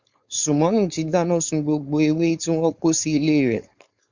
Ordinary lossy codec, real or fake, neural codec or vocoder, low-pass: Opus, 64 kbps; fake; codec, 16 kHz, 4.8 kbps, FACodec; 7.2 kHz